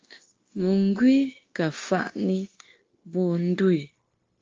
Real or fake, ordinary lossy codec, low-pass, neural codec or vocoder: fake; Opus, 32 kbps; 7.2 kHz; codec, 16 kHz, 0.9 kbps, LongCat-Audio-Codec